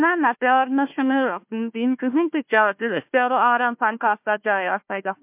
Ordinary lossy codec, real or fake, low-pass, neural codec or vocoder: MP3, 32 kbps; fake; 3.6 kHz; codec, 16 kHz, 1 kbps, FunCodec, trained on Chinese and English, 50 frames a second